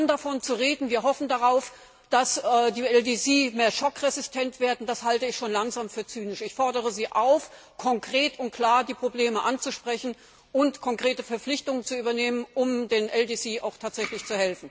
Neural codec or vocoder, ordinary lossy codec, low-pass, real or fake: none; none; none; real